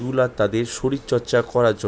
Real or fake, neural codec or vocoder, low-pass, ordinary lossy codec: real; none; none; none